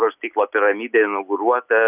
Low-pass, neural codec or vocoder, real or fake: 3.6 kHz; none; real